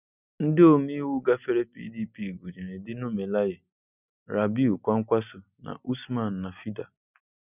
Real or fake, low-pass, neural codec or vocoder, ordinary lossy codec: real; 3.6 kHz; none; none